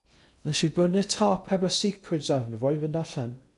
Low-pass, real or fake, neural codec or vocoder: 10.8 kHz; fake; codec, 16 kHz in and 24 kHz out, 0.6 kbps, FocalCodec, streaming, 2048 codes